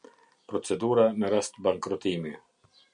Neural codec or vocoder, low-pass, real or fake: none; 9.9 kHz; real